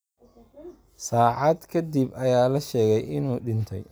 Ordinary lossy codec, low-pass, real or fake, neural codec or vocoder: none; none; real; none